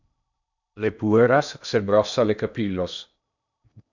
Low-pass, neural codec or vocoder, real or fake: 7.2 kHz; codec, 16 kHz in and 24 kHz out, 0.8 kbps, FocalCodec, streaming, 65536 codes; fake